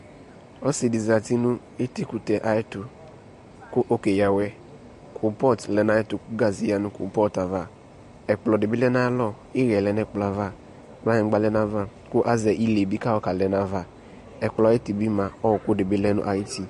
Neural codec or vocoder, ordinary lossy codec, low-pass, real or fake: none; MP3, 48 kbps; 14.4 kHz; real